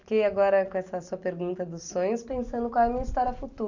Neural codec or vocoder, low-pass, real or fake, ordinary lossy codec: none; 7.2 kHz; real; none